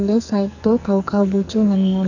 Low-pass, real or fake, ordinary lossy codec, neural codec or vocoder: 7.2 kHz; fake; none; codec, 44.1 kHz, 2.6 kbps, SNAC